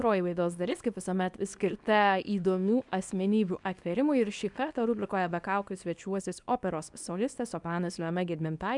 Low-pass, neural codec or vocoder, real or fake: 10.8 kHz; codec, 24 kHz, 0.9 kbps, WavTokenizer, small release; fake